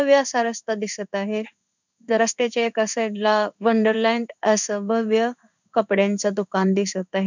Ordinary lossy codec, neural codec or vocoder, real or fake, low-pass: none; codec, 16 kHz in and 24 kHz out, 1 kbps, XY-Tokenizer; fake; 7.2 kHz